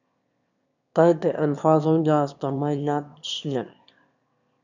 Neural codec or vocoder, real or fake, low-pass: autoencoder, 22.05 kHz, a latent of 192 numbers a frame, VITS, trained on one speaker; fake; 7.2 kHz